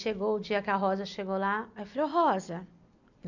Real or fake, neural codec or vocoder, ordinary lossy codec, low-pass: real; none; none; 7.2 kHz